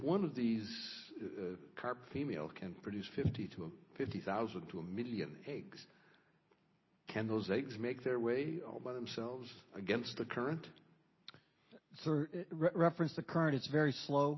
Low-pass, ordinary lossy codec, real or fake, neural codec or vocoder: 7.2 kHz; MP3, 24 kbps; real; none